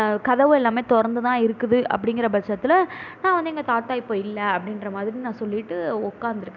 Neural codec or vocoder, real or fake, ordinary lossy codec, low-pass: none; real; none; 7.2 kHz